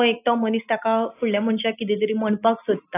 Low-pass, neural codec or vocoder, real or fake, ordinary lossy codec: 3.6 kHz; none; real; AAC, 24 kbps